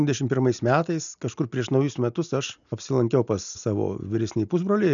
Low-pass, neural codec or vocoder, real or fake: 7.2 kHz; none; real